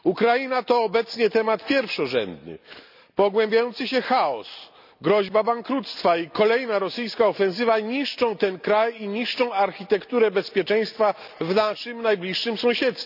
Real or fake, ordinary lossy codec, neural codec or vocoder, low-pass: real; none; none; 5.4 kHz